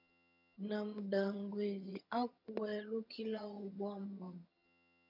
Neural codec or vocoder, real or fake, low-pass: vocoder, 22.05 kHz, 80 mel bands, HiFi-GAN; fake; 5.4 kHz